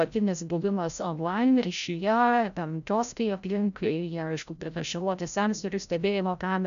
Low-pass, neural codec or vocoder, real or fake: 7.2 kHz; codec, 16 kHz, 0.5 kbps, FreqCodec, larger model; fake